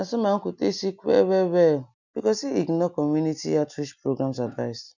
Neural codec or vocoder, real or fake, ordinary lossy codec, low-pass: none; real; none; 7.2 kHz